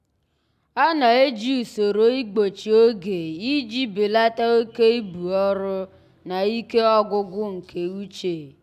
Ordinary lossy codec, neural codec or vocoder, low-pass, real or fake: none; none; 14.4 kHz; real